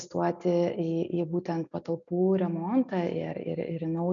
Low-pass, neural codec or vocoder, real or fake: 7.2 kHz; none; real